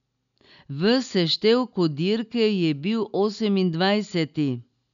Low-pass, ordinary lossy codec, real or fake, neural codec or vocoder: 7.2 kHz; none; real; none